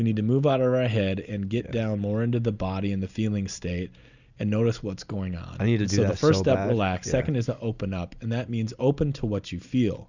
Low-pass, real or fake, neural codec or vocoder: 7.2 kHz; real; none